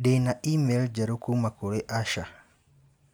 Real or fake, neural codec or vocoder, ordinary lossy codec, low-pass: real; none; none; none